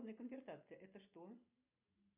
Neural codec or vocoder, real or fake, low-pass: none; real; 3.6 kHz